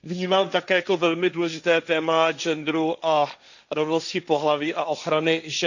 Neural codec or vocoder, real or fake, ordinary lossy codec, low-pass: codec, 16 kHz, 1.1 kbps, Voila-Tokenizer; fake; none; 7.2 kHz